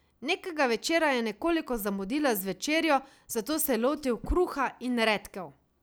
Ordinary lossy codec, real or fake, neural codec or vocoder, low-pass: none; real; none; none